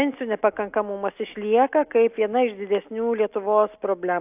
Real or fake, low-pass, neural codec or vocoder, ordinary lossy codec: real; 3.6 kHz; none; AAC, 32 kbps